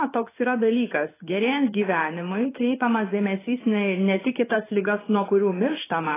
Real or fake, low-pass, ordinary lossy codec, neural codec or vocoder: fake; 3.6 kHz; AAC, 16 kbps; codec, 16 kHz in and 24 kHz out, 1 kbps, XY-Tokenizer